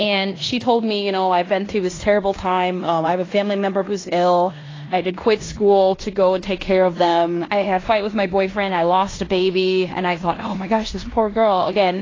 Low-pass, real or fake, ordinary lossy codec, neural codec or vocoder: 7.2 kHz; fake; AAC, 32 kbps; codec, 16 kHz in and 24 kHz out, 0.9 kbps, LongCat-Audio-Codec, fine tuned four codebook decoder